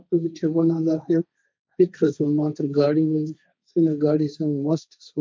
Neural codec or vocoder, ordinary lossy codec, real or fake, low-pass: codec, 16 kHz, 1.1 kbps, Voila-Tokenizer; none; fake; none